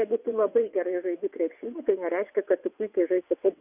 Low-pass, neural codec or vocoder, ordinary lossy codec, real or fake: 3.6 kHz; vocoder, 22.05 kHz, 80 mel bands, WaveNeXt; Opus, 64 kbps; fake